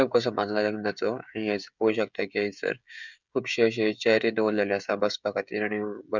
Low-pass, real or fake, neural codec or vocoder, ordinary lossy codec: 7.2 kHz; fake; codec, 16 kHz, 16 kbps, FreqCodec, smaller model; none